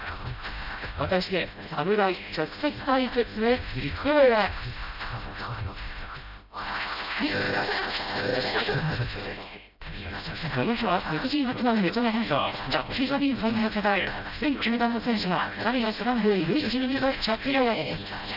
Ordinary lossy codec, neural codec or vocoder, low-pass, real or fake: none; codec, 16 kHz, 0.5 kbps, FreqCodec, smaller model; 5.4 kHz; fake